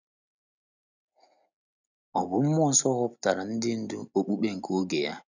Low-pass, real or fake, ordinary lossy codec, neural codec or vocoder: 7.2 kHz; real; none; none